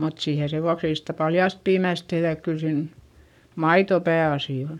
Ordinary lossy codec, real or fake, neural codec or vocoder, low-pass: none; fake; codec, 44.1 kHz, 7.8 kbps, Pupu-Codec; 19.8 kHz